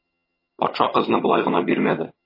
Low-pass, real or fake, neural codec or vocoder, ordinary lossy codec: 5.4 kHz; fake; vocoder, 22.05 kHz, 80 mel bands, HiFi-GAN; MP3, 24 kbps